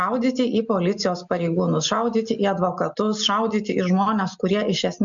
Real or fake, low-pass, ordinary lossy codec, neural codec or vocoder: real; 7.2 kHz; MP3, 64 kbps; none